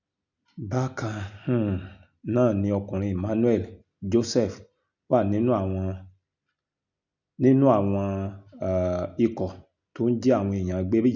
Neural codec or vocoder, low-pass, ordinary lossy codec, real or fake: none; 7.2 kHz; none; real